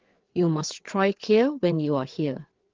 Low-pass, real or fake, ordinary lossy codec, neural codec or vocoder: 7.2 kHz; fake; Opus, 16 kbps; codec, 16 kHz, 4 kbps, FreqCodec, larger model